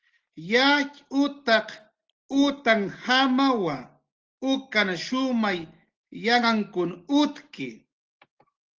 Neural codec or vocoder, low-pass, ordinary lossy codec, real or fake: none; 7.2 kHz; Opus, 16 kbps; real